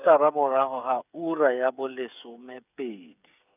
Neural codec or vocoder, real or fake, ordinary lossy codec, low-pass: codec, 16 kHz, 8 kbps, FreqCodec, smaller model; fake; none; 3.6 kHz